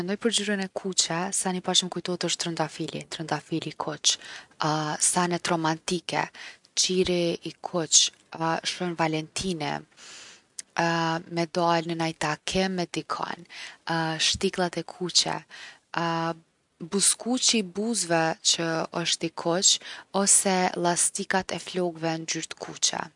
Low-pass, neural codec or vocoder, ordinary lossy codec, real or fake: 10.8 kHz; none; none; real